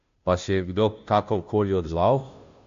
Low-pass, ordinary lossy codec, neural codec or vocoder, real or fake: 7.2 kHz; MP3, 48 kbps; codec, 16 kHz, 0.5 kbps, FunCodec, trained on Chinese and English, 25 frames a second; fake